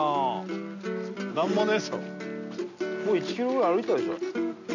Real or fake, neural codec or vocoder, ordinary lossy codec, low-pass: real; none; none; 7.2 kHz